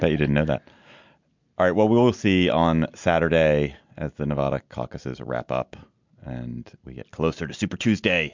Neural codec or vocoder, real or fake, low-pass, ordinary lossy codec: none; real; 7.2 kHz; MP3, 64 kbps